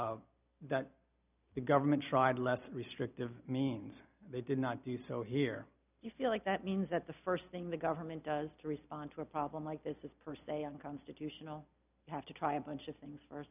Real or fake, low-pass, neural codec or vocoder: real; 3.6 kHz; none